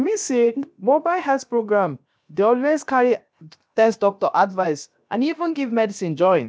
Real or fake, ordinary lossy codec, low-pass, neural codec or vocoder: fake; none; none; codec, 16 kHz, 0.7 kbps, FocalCodec